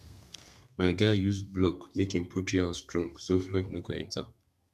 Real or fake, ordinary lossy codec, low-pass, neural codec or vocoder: fake; none; 14.4 kHz; codec, 32 kHz, 1.9 kbps, SNAC